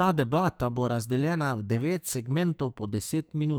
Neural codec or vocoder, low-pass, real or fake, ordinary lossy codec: codec, 44.1 kHz, 2.6 kbps, SNAC; none; fake; none